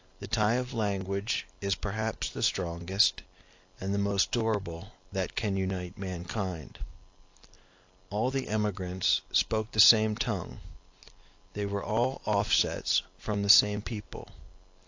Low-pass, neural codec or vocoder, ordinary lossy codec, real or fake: 7.2 kHz; none; AAC, 48 kbps; real